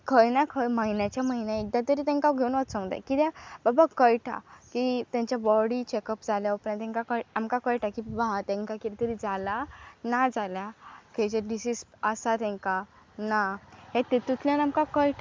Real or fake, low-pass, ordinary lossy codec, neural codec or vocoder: real; none; none; none